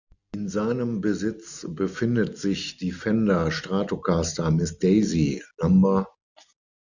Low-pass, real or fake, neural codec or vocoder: 7.2 kHz; real; none